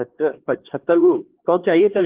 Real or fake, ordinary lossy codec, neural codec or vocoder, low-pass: fake; Opus, 16 kbps; codec, 16 kHz, 2 kbps, X-Codec, HuBERT features, trained on LibriSpeech; 3.6 kHz